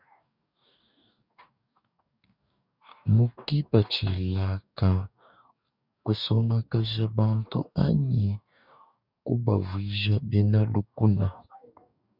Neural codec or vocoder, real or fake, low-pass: codec, 44.1 kHz, 2.6 kbps, DAC; fake; 5.4 kHz